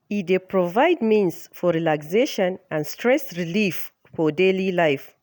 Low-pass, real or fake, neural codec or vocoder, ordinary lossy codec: none; real; none; none